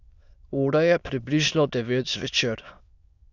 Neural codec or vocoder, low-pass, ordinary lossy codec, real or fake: autoencoder, 22.05 kHz, a latent of 192 numbers a frame, VITS, trained on many speakers; 7.2 kHz; none; fake